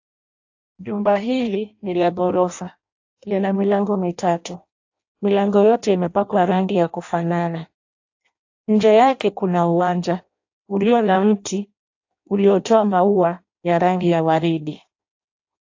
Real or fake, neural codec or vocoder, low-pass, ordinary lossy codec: fake; codec, 16 kHz in and 24 kHz out, 0.6 kbps, FireRedTTS-2 codec; 7.2 kHz; AAC, 48 kbps